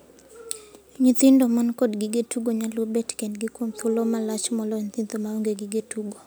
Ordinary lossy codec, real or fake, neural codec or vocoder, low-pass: none; real; none; none